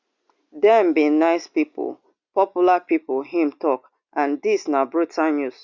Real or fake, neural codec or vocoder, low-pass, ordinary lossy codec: real; none; 7.2 kHz; Opus, 64 kbps